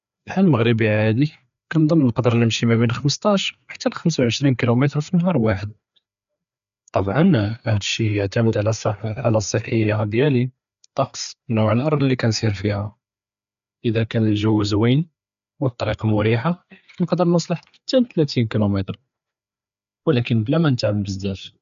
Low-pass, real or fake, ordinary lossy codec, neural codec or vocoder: 7.2 kHz; fake; AAC, 96 kbps; codec, 16 kHz, 4 kbps, FreqCodec, larger model